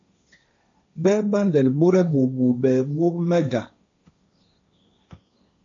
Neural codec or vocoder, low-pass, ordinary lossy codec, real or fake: codec, 16 kHz, 1.1 kbps, Voila-Tokenizer; 7.2 kHz; AAC, 64 kbps; fake